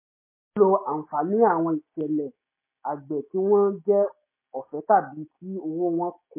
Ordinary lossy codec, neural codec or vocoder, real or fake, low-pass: MP3, 24 kbps; none; real; 3.6 kHz